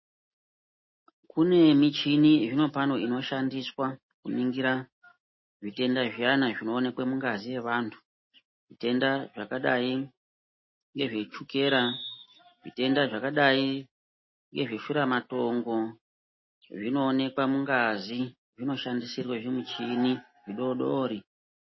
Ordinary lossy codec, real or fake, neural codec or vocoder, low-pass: MP3, 24 kbps; real; none; 7.2 kHz